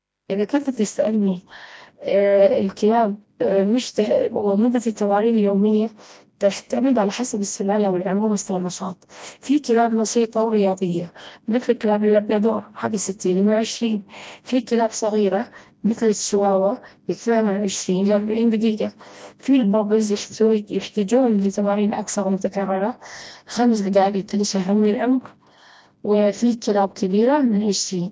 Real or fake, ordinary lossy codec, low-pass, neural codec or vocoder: fake; none; none; codec, 16 kHz, 1 kbps, FreqCodec, smaller model